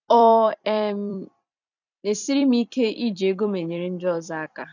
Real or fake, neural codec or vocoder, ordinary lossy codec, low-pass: fake; vocoder, 44.1 kHz, 128 mel bands every 256 samples, BigVGAN v2; none; 7.2 kHz